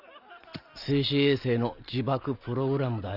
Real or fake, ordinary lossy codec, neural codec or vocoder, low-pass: real; Opus, 64 kbps; none; 5.4 kHz